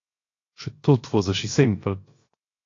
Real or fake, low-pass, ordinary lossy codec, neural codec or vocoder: fake; 7.2 kHz; AAC, 32 kbps; codec, 16 kHz, 0.7 kbps, FocalCodec